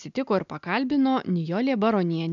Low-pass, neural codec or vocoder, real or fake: 7.2 kHz; none; real